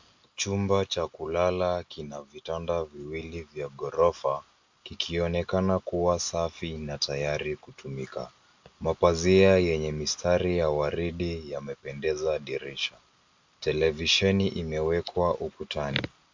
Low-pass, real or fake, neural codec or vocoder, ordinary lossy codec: 7.2 kHz; real; none; MP3, 64 kbps